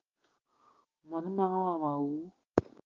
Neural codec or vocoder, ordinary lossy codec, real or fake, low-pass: codec, 16 kHz, 6 kbps, DAC; Opus, 24 kbps; fake; 7.2 kHz